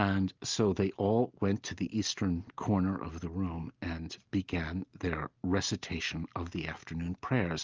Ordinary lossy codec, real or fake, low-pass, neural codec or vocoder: Opus, 32 kbps; real; 7.2 kHz; none